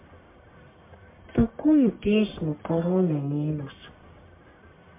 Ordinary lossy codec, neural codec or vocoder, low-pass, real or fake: MP3, 24 kbps; codec, 44.1 kHz, 1.7 kbps, Pupu-Codec; 3.6 kHz; fake